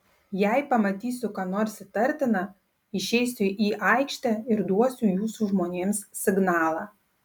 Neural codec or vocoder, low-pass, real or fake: none; 19.8 kHz; real